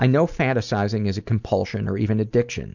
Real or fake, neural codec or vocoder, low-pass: real; none; 7.2 kHz